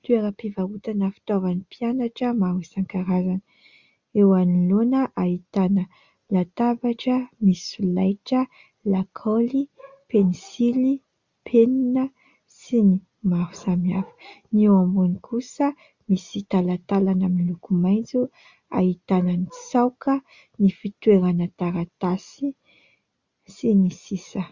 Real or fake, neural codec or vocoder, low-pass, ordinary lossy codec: real; none; 7.2 kHz; Opus, 64 kbps